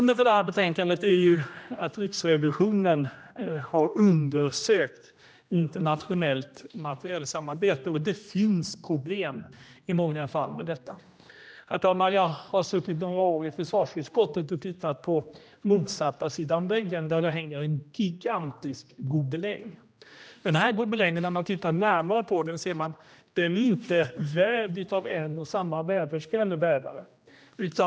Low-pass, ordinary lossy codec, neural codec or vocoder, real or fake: none; none; codec, 16 kHz, 1 kbps, X-Codec, HuBERT features, trained on general audio; fake